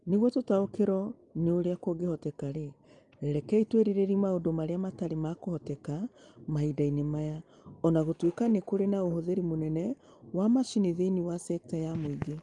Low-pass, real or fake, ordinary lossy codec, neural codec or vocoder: 10.8 kHz; real; Opus, 32 kbps; none